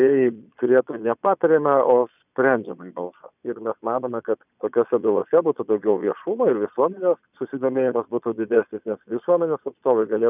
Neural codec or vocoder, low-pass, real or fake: vocoder, 22.05 kHz, 80 mel bands, Vocos; 3.6 kHz; fake